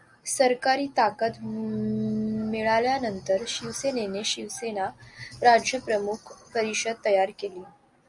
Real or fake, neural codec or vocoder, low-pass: real; none; 10.8 kHz